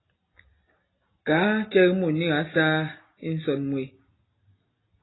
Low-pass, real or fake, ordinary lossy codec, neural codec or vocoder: 7.2 kHz; real; AAC, 16 kbps; none